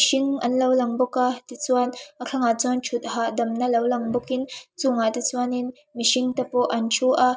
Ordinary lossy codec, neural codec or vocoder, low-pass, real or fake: none; none; none; real